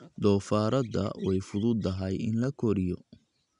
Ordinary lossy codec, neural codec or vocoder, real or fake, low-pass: none; none; real; 10.8 kHz